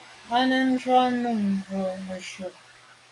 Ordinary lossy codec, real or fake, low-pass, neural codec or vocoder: AAC, 64 kbps; fake; 10.8 kHz; codec, 44.1 kHz, 7.8 kbps, DAC